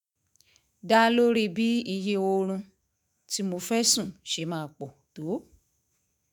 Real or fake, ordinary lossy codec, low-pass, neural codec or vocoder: fake; none; none; autoencoder, 48 kHz, 128 numbers a frame, DAC-VAE, trained on Japanese speech